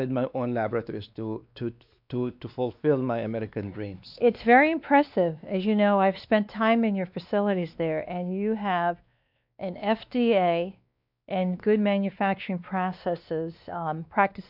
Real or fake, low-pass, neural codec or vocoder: fake; 5.4 kHz; codec, 16 kHz, 2 kbps, X-Codec, WavLM features, trained on Multilingual LibriSpeech